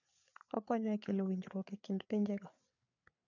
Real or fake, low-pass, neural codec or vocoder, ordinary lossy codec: fake; 7.2 kHz; codec, 16 kHz, 4 kbps, FreqCodec, larger model; none